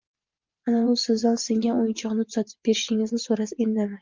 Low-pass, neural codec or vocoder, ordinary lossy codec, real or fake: 7.2 kHz; vocoder, 44.1 kHz, 80 mel bands, Vocos; Opus, 24 kbps; fake